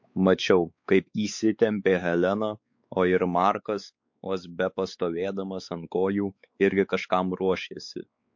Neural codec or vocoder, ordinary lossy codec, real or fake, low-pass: codec, 16 kHz, 4 kbps, X-Codec, WavLM features, trained on Multilingual LibriSpeech; MP3, 48 kbps; fake; 7.2 kHz